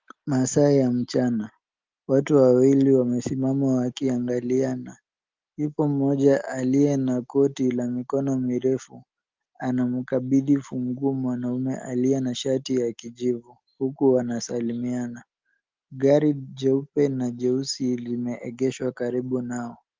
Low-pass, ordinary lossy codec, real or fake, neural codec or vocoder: 7.2 kHz; Opus, 32 kbps; real; none